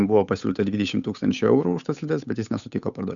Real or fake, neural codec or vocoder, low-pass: fake; codec, 16 kHz, 8 kbps, FunCodec, trained on Chinese and English, 25 frames a second; 7.2 kHz